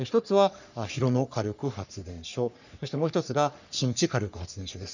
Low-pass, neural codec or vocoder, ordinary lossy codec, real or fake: 7.2 kHz; codec, 44.1 kHz, 3.4 kbps, Pupu-Codec; none; fake